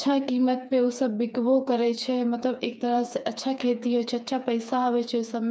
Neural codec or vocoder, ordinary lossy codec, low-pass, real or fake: codec, 16 kHz, 4 kbps, FreqCodec, smaller model; none; none; fake